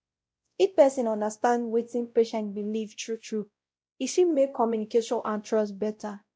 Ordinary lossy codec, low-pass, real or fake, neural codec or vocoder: none; none; fake; codec, 16 kHz, 0.5 kbps, X-Codec, WavLM features, trained on Multilingual LibriSpeech